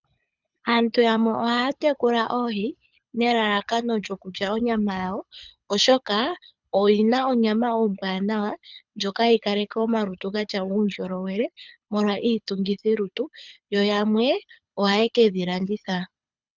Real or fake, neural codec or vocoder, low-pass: fake; codec, 24 kHz, 6 kbps, HILCodec; 7.2 kHz